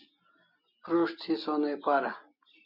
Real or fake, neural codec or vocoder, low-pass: real; none; 5.4 kHz